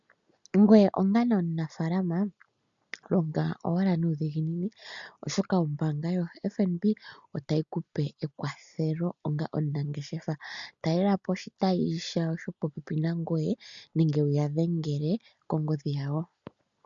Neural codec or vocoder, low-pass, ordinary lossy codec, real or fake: none; 7.2 kHz; MP3, 96 kbps; real